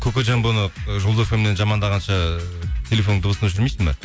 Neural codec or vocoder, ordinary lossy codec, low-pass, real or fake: none; none; none; real